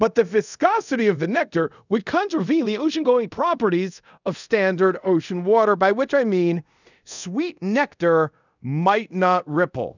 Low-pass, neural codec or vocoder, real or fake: 7.2 kHz; codec, 24 kHz, 0.5 kbps, DualCodec; fake